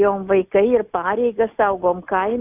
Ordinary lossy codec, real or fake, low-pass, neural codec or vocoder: AAC, 32 kbps; real; 3.6 kHz; none